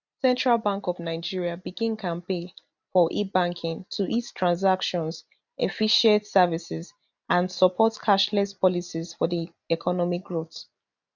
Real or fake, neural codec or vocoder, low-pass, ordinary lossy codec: real; none; 7.2 kHz; none